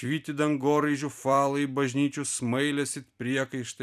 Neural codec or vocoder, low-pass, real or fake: vocoder, 44.1 kHz, 128 mel bands every 256 samples, BigVGAN v2; 14.4 kHz; fake